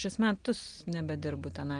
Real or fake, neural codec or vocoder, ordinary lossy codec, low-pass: real; none; Opus, 24 kbps; 9.9 kHz